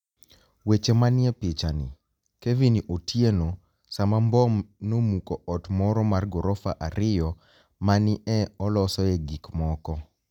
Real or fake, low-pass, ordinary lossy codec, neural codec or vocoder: real; 19.8 kHz; none; none